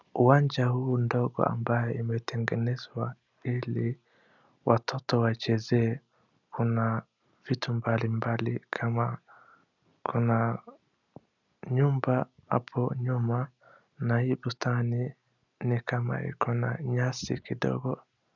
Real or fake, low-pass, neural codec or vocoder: real; 7.2 kHz; none